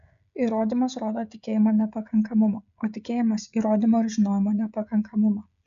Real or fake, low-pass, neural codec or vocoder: fake; 7.2 kHz; codec, 16 kHz, 16 kbps, FreqCodec, smaller model